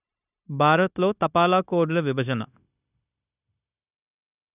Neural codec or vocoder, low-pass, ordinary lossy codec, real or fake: codec, 16 kHz, 0.9 kbps, LongCat-Audio-Codec; 3.6 kHz; none; fake